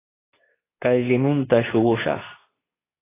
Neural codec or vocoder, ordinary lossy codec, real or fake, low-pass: codec, 24 kHz, 0.9 kbps, WavTokenizer, medium speech release version 2; AAC, 16 kbps; fake; 3.6 kHz